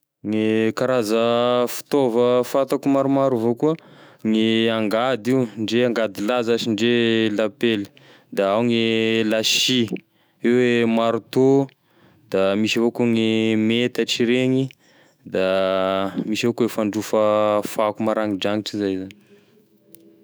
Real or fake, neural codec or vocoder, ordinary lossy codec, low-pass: fake; autoencoder, 48 kHz, 128 numbers a frame, DAC-VAE, trained on Japanese speech; none; none